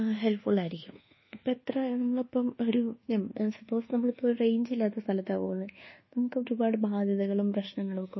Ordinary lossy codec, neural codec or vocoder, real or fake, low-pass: MP3, 24 kbps; codec, 24 kHz, 1.2 kbps, DualCodec; fake; 7.2 kHz